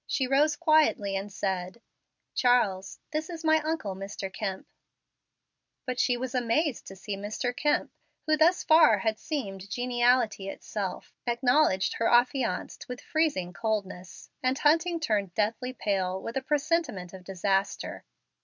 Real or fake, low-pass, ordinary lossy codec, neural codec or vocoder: real; 7.2 kHz; MP3, 64 kbps; none